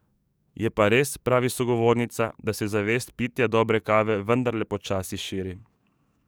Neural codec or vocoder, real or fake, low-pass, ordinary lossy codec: codec, 44.1 kHz, 7.8 kbps, DAC; fake; none; none